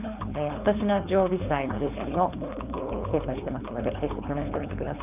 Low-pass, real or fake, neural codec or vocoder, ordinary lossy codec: 3.6 kHz; fake; codec, 16 kHz, 4.8 kbps, FACodec; none